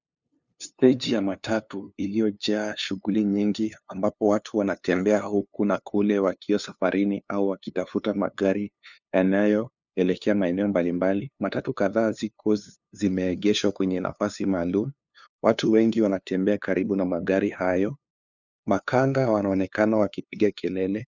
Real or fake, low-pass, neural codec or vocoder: fake; 7.2 kHz; codec, 16 kHz, 2 kbps, FunCodec, trained on LibriTTS, 25 frames a second